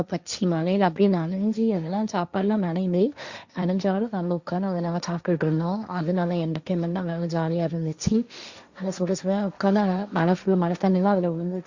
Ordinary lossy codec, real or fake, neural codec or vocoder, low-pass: Opus, 64 kbps; fake; codec, 16 kHz, 1.1 kbps, Voila-Tokenizer; 7.2 kHz